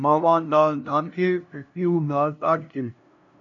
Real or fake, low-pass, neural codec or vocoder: fake; 7.2 kHz; codec, 16 kHz, 0.5 kbps, FunCodec, trained on LibriTTS, 25 frames a second